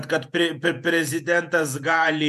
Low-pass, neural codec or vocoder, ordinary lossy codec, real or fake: 14.4 kHz; vocoder, 48 kHz, 128 mel bands, Vocos; MP3, 96 kbps; fake